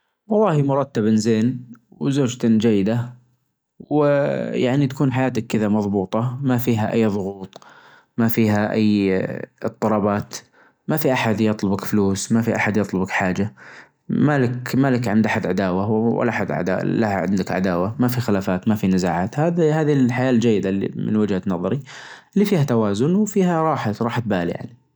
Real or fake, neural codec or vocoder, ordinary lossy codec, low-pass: real; none; none; none